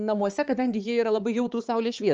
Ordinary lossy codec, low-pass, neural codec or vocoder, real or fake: Opus, 24 kbps; 7.2 kHz; codec, 16 kHz, 4 kbps, X-Codec, HuBERT features, trained on balanced general audio; fake